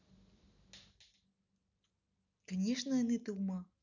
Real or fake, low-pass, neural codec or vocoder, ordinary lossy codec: real; 7.2 kHz; none; none